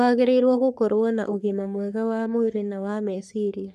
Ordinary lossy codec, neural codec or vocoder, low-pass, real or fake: none; codec, 44.1 kHz, 3.4 kbps, Pupu-Codec; 14.4 kHz; fake